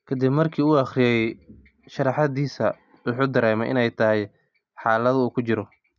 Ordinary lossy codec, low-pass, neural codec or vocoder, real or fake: none; 7.2 kHz; none; real